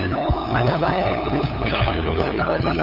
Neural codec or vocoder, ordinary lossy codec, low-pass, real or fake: codec, 16 kHz, 8 kbps, FunCodec, trained on LibriTTS, 25 frames a second; none; 5.4 kHz; fake